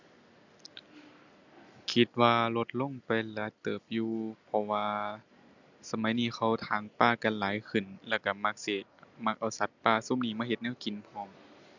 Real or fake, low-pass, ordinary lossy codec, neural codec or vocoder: real; 7.2 kHz; none; none